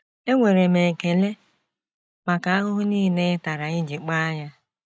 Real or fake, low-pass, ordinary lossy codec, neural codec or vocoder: real; none; none; none